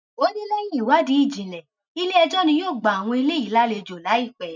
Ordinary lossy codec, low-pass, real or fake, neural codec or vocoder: none; 7.2 kHz; real; none